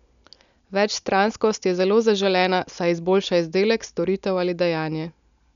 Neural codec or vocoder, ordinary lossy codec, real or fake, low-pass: none; none; real; 7.2 kHz